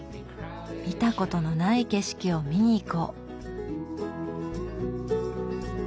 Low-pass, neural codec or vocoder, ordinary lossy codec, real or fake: none; none; none; real